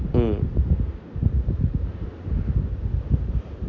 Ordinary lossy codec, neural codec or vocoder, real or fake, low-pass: none; none; real; 7.2 kHz